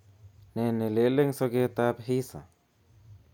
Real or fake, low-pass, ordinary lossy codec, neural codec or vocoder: real; 19.8 kHz; none; none